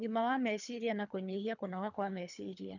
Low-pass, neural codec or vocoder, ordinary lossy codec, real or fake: 7.2 kHz; codec, 24 kHz, 3 kbps, HILCodec; none; fake